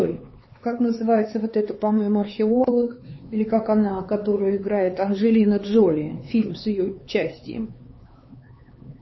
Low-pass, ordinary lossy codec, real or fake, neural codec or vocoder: 7.2 kHz; MP3, 24 kbps; fake; codec, 16 kHz, 4 kbps, X-Codec, HuBERT features, trained on LibriSpeech